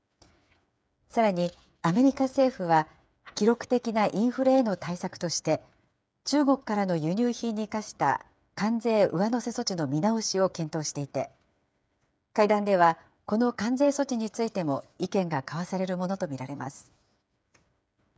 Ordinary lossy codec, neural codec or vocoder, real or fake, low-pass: none; codec, 16 kHz, 8 kbps, FreqCodec, smaller model; fake; none